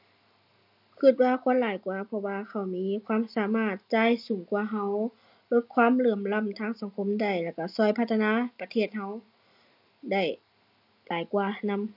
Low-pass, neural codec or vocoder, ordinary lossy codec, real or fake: 5.4 kHz; none; none; real